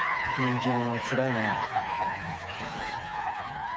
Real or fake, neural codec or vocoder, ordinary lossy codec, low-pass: fake; codec, 16 kHz, 4 kbps, FreqCodec, smaller model; none; none